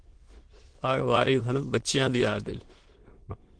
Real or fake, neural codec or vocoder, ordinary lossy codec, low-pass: fake; autoencoder, 22.05 kHz, a latent of 192 numbers a frame, VITS, trained on many speakers; Opus, 16 kbps; 9.9 kHz